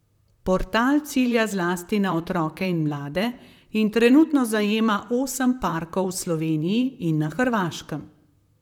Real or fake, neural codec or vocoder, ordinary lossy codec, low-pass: fake; vocoder, 44.1 kHz, 128 mel bands, Pupu-Vocoder; none; 19.8 kHz